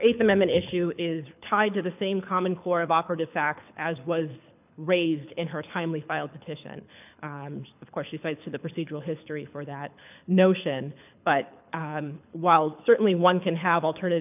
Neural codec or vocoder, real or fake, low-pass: codec, 24 kHz, 6 kbps, HILCodec; fake; 3.6 kHz